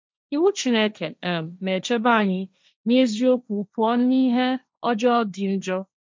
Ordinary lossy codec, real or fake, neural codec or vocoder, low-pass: none; fake; codec, 16 kHz, 1.1 kbps, Voila-Tokenizer; none